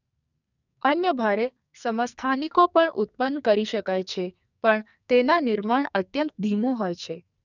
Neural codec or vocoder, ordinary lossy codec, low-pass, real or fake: codec, 44.1 kHz, 2.6 kbps, SNAC; none; 7.2 kHz; fake